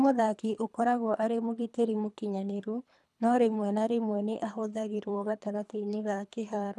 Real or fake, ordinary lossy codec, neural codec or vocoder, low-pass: fake; none; codec, 24 kHz, 3 kbps, HILCodec; none